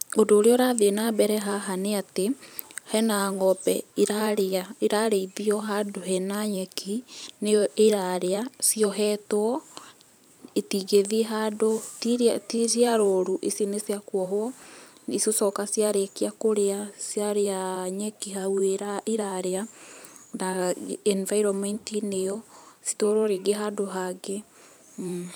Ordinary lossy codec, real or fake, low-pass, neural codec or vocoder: none; fake; none; vocoder, 44.1 kHz, 128 mel bands every 512 samples, BigVGAN v2